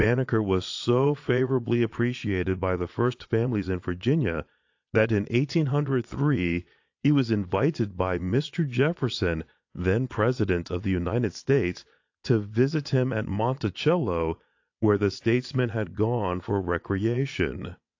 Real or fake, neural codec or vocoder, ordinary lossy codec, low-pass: fake; vocoder, 22.05 kHz, 80 mel bands, Vocos; AAC, 48 kbps; 7.2 kHz